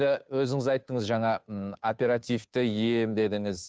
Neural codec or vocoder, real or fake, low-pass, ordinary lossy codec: codec, 16 kHz, 2 kbps, FunCodec, trained on Chinese and English, 25 frames a second; fake; none; none